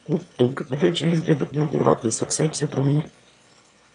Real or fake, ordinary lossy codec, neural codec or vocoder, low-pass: fake; AAC, 64 kbps; autoencoder, 22.05 kHz, a latent of 192 numbers a frame, VITS, trained on one speaker; 9.9 kHz